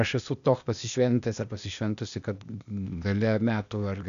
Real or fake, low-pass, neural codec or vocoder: fake; 7.2 kHz; codec, 16 kHz, 0.8 kbps, ZipCodec